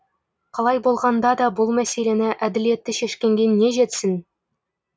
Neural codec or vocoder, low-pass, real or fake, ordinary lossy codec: none; none; real; none